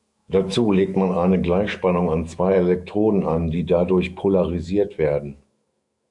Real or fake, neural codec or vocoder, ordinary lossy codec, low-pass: fake; autoencoder, 48 kHz, 128 numbers a frame, DAC-VAE, trained on Japanese speech; MP3, 96 kbps; 10.8 kHz